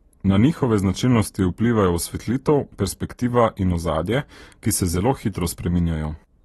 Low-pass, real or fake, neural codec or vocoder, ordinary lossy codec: 14.4 kHz; real; none; AAC, 32 kbps